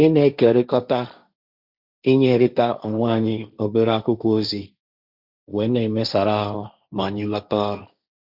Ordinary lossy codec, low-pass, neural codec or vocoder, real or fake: Opus, 64 kbps; 5.4 kHz; codec, 16 kHz, 1.1 kbps, Voila-Tokenizer; fake